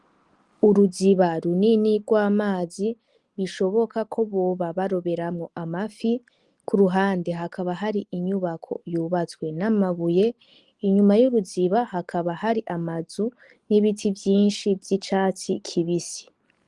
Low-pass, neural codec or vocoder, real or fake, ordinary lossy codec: 10.8 kHz; none; real; Opus, 24 kbps